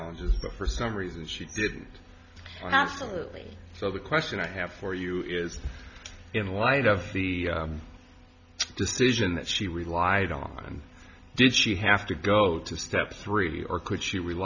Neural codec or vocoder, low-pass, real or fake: none; 7.2 kHz; real